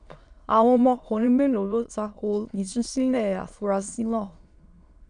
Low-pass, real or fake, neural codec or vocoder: 9.9 kHz; fake; autoencoder, 22.05 kHz, a latent of 192 numbers a frame, VITS, trained on many speakers